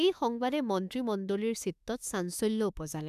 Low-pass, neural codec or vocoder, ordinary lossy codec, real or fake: 14.4 kHz; autoencoder, 48 kHz, 32 numbers a frame, DAC-VAE, trained on Japanese speech; none; fake